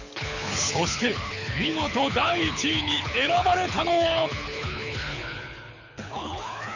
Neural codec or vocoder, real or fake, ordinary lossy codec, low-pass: codec, 24 kHz, 6 kbps, HILCodec; fake; none; 7.2 kHz